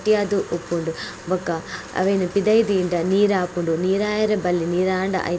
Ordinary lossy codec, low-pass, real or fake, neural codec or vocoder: none; none; real; none